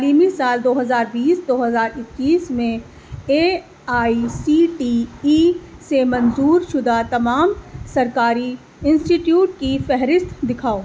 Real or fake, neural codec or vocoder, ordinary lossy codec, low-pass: real; none; none; none